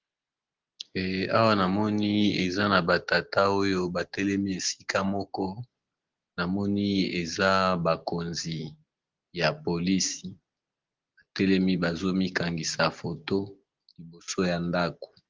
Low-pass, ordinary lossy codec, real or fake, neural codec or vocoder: 7.2 kHz; Opus, 16 kbps; real; none